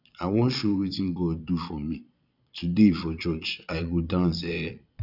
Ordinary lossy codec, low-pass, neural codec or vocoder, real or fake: none; 5.4 kHz; vocoder, 22.05 kHz, 80 mel bands, Vocos; fake